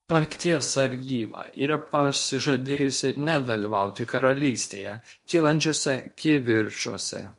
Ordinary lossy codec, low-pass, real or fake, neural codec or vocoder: MP3, 64 kbps; 10.8 kHz; fake; codec, 16 kHz in and 24 kHz out, 0.8 kbps, FocalCodec, streaming, 65536 codes